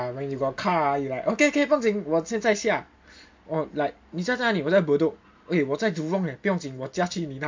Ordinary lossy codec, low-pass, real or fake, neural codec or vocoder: MP3, 48 kbps; 7.2 kHz; real; none